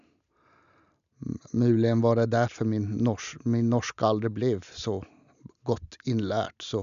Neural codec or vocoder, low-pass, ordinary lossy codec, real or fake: none; 7.2 kHz; none; real